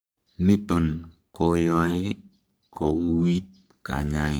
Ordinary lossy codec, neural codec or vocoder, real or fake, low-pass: none; codec, 44.1 kHz, 3.4 kbps, Pupu-Codec; fake; none